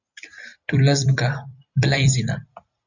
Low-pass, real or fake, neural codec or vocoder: 7.2 kHz; fake; vocoder, 44.1 kHz, 128 mel bands every 256 samples, BigVGAN v2